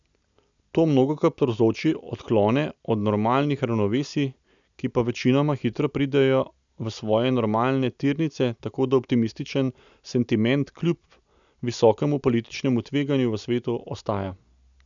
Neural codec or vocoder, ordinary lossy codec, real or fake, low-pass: none; none; real; 7.2 kHz